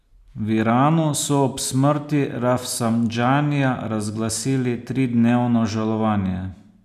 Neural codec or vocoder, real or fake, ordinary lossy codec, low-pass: none; real; none; 14.4 kHz